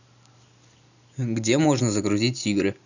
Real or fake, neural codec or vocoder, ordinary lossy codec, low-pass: real; none; none; 7.2 kHz